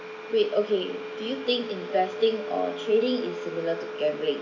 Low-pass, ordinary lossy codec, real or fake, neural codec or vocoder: 7.2 kHz; none; real; none